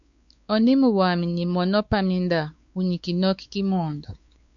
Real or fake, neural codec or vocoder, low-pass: fake; codec, 16 kHz, 4 kbps, X-Codec, WavLM features, trained on Multilingual LibriSpeech; 7.2 kHz